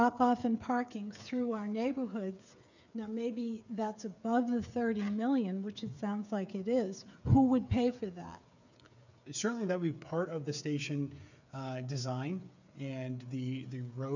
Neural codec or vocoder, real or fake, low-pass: codec, 16 kHz, 8 kbps, FreqCodec, smaller model; fake; 7.2 kHz